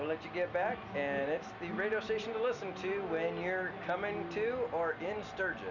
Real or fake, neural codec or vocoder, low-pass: real; none; 7.2 kHz